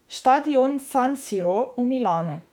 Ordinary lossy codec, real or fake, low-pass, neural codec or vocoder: none; fake; 19.8 kHz; autoencoder, 48 kHz, 32 numbers a frame, DAC-VAE, trained on Japanese speech